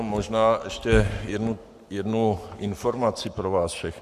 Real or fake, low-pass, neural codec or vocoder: fake; 14.4 kHz; codec, 44.1 kHz, 7.8 kbps, Pupu-Codec